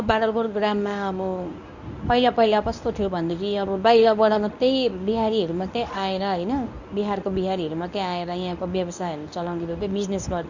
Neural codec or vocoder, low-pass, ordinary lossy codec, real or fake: codec, 24 kHz, 0.9 kbps, WavTokenizer, medium speech release version 1; 7.2 kHz; none; fake